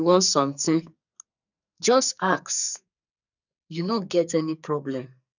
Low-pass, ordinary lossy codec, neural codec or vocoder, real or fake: 7.2 kHz; none; codec, 44.1 kHz, 2.6 kbps, SNAC; fake